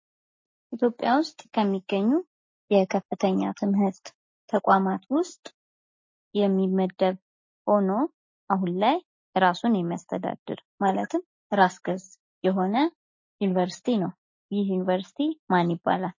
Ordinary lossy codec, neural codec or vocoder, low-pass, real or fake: MP3, 32 kbps; none; 7.2 kHz; real